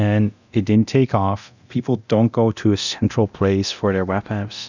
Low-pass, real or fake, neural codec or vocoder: 7.2 kHz; fake; codec, 24 kHz, 0.9 kbps, DualCodec